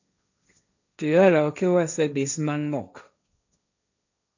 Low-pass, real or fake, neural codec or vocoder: 7.2 kHz; fake; codec, 16 kHz, 1.1 kbps, Voila-Tokenizer